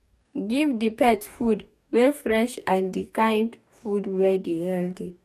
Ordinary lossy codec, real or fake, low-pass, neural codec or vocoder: none; fake; 14.4 kHz; codec, 44.1 kHz, 2.6 kbps, DAC